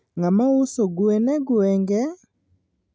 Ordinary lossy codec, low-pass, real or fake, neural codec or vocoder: none; none; real; none